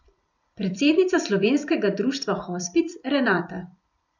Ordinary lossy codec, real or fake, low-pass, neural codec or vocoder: none; fake; 7.2 kHz; vocoder, 44.1 kHz, 128 mel bands every 256 samples, BigVGAN v2